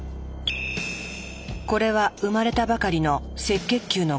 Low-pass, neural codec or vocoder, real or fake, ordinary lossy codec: none; none; real; none